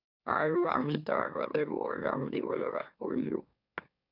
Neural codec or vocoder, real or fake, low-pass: autoencoder, 44.1 kHz, a latent of 192 numbers a frame, MeloTTS; fake; 5.4 kHz